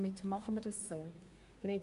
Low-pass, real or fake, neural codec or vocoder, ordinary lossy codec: 10.8 kHz; fake; codec, 24 kHz, 1 kbps, SNAC; none